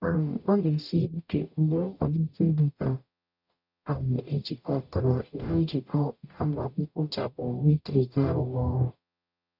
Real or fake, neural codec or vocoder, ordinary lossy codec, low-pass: fake; codec, 44.1 kHz, 0.9 kbps, DAC; none; 5.4 kHz